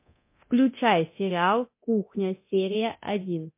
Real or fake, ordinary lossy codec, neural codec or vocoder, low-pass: fake; MP3, 24 kbps; codec, 24 kHz, 0.9 kbps, DualCodec; 3.6 kHz